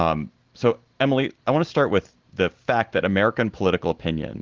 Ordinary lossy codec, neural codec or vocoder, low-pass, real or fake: Opus, 16 kbps; none; 7.2 kHz; real